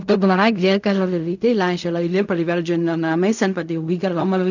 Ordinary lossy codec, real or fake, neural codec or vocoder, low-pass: none; fake; codec, 16 kHz in and 24 kHz out, 0.4 kbps, LongCat-Audio-Codec, fine tuned four codebook decoder; 7.2 kHz